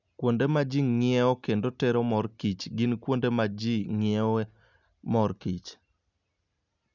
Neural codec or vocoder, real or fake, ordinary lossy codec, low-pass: none; real; none; 7.2 kHz